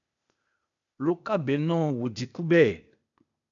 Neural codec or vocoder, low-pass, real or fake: codec, 16 kHz, 0.8 kbps, ZipCodec; 7.2 kHz; fake